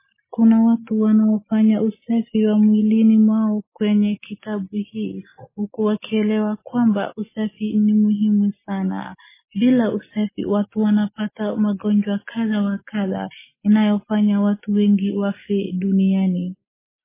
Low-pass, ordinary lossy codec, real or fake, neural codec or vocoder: 3.6 kHz; MP3, 16 kbps; real; none